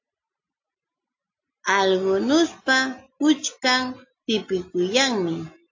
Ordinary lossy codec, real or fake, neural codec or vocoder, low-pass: AAC, 48 kbps; real; none; 7.2 kHz